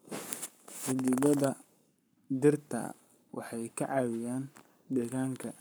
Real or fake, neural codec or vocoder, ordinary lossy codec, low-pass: fake; codec, 44.1 kHz, 7.8 kbps, Pupu-Codec; none; none